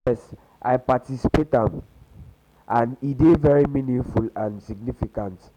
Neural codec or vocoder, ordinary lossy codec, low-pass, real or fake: none; none; 19.8 kHz; real